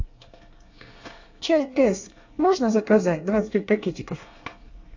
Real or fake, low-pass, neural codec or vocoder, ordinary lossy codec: fake; 7.2 kHz; codec, 24 kHz, 1 kbps, SNAC; AAC, 48 kbps